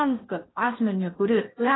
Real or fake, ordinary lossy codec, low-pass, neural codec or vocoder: fake; AAC, 16 kbps; 7.2 kHz; codec, 16 kHz in and 24 kHz out, 0.8 kbps, FocalCodec, streaming, 65536 codes